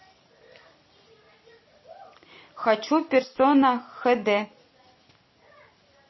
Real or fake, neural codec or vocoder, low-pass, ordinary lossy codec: real; none; 7.2 kHz; MP3, 24 kbps